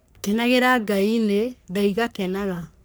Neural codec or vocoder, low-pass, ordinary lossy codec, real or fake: codec, 44.1 kHz, 3.4 kbps, Pupu-Codec; none; none; fake